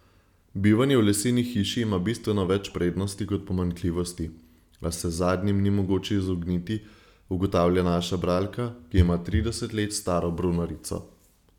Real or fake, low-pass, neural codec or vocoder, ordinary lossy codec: real; 19.8 kHz; none; none